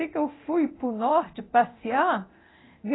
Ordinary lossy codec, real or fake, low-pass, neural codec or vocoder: AAC, 16 kbps; fake; 7.2 kHz; codec, 24 kHz, 0.9 kbps, DualCodec